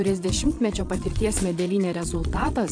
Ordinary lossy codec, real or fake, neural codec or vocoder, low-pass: AAC, 64 kbps; fake; vocoder, 22.05 kHz, 80 mel bands, Vocos; 9.9 kHz